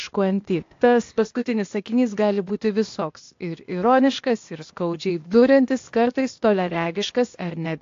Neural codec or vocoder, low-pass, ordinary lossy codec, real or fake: codec, 16 kHz, 0.8 kbps, ZipCodec; 7.2 kHz; AAC, 48 kbps; fake